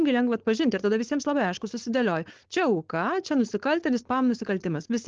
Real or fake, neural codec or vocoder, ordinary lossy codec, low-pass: fake; codec, 16 kHz, 4.8 kbps, FACodec; Opus, 16 kbps; 7.2 kHz